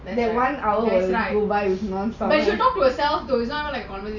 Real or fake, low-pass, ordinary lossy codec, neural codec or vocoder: real; 7.2 kHz; none; none